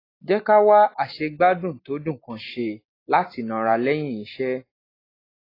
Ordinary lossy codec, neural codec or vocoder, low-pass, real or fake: AAC, 24 kbps; none; 5.4 kHz; real